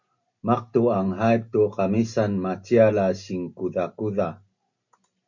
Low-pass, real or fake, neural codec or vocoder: 7.2 kHz; real; none